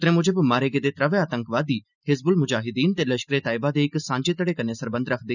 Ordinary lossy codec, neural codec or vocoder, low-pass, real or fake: none; none; 7.2 kHz; real